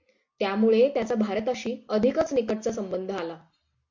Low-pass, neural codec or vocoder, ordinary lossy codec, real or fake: 7.2 kHz; none; MP3, 48 kbps; real